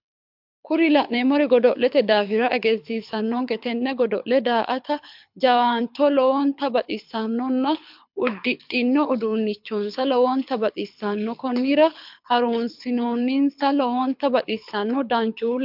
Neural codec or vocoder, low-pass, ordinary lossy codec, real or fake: codec, 24 kHz, 6 kbps, HILCodec; 5.4 kHz; MP3, 48 kbps; fake